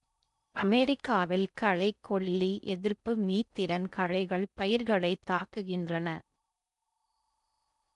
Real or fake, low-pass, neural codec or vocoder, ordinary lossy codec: fake; 10.8 kHz; codec, 16 kHz in and 24 kHz out, 0.6 kbps, FocalCodec, streaming, 4096 codes; none